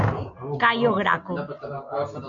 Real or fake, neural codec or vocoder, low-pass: real; none; 7.2 kHz